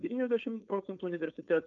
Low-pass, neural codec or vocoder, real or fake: 7.2 kHz; codec, 16 kHz, 4.8 kbps, FACodec; fake